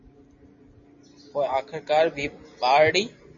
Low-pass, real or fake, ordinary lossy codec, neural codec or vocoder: 7.2 kHz; real; MP3, 32 kbps; none